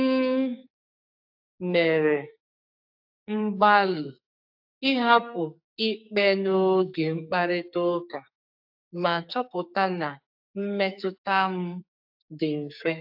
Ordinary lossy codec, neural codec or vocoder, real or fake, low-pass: AAC, 48 kbps; codec, 44.1 kHz, 2.6 kbps, SNAC; fake; 5.4 kHz